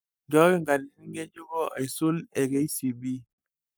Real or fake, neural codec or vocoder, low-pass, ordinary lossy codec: fake; codec, 44.1 kHz, 7.8 kbps, Pupu-Codec; none; none